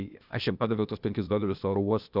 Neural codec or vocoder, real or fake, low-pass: codec, 16 kHz, 0.8 kbps, ZipCodec; fake; 5.4 kHz